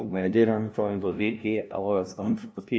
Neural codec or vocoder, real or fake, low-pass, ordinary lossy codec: codec, 16 kHz, 0.5 kbps, FunCodec, trained on LibriTTS, 25 frames a second; fake; none; none